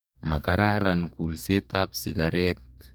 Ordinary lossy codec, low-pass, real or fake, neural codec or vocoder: none; none; fake; codec, 44.1 kHz, 2.6 kbps, DAC